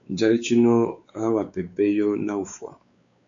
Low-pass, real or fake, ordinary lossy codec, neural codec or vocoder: 7.2 kHz; fake; AAC, 64 kbps; codec, 16 kHz, 4 kbps, X-Codec, WavLM features, trained on Multilingual LibriSpeech